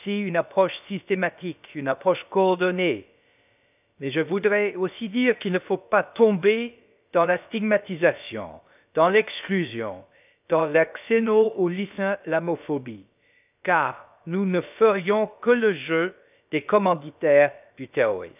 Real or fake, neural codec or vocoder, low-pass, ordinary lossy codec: fake; codec, 16 kHz, about 1 kbps, DyCAST, with the encoder's durations; 3.6 kHz; none